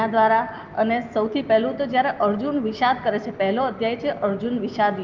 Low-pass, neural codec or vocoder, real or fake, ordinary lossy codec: 7.2 kHz; none; real; Opus, 32 kbps